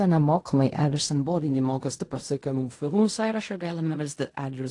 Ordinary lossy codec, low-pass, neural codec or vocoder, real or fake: AAC, 48 kbps; 10.8 kHz; codec, 16 kHz in and 24 kHz out, 0.4 kbps, LongCat-Audio-Codec, fine tuned four codebook decoder; fake